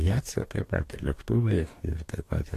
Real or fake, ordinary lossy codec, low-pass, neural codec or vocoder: fake; AAC, 48 kbps; 14.4 kHz; codec, 44.1 kHz, 2.6 kbps, DAC